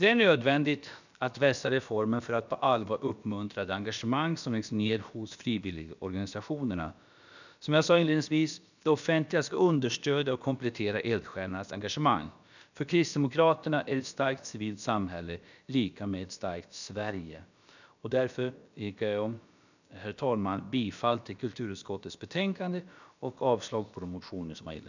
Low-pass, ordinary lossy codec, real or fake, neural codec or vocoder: 7.2 kHz; none; fake; codec, 16 kHz, about 1 kbps, DyCAST, with the encoder's durations